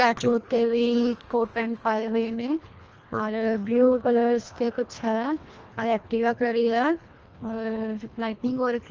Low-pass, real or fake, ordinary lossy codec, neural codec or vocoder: 7.2 kHz; fake; Opus, 24 kbps; codec, 24 kHz, 1.5 kbps, HILCodec